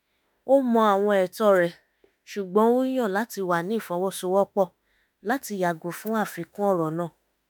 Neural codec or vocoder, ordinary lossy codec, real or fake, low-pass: autoencoder, 48 kHz, 32 numbers a frame, DAC-VAE, trained on Japanese speech; none; fake; none